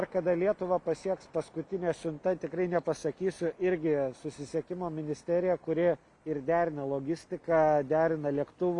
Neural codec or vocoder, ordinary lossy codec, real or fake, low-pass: none; AAC, 64 kbps; real; 10.8 kHz